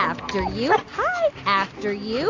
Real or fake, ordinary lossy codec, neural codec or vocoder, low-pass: real; AAC, 32 kbps; none; 7.2 kHz